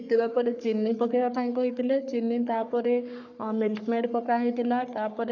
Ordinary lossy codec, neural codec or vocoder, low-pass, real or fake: none; codec, 44.1 kHz, 3.4 kbps, Pupu-Codec; 7.2 kHz; fake